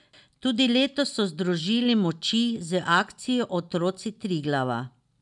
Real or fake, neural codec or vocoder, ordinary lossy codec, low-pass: real; none; none; 10.8 kHz